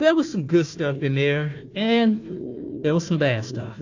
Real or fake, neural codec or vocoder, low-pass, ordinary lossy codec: fake; codec, 16 kHz, 1 kbps, FunCodec, trained on Chinese and English, 50 frames a second; 7.2 kHz; AAC, 48 kbps